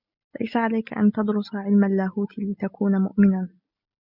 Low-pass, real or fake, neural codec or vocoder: 5.4 kHz; real; none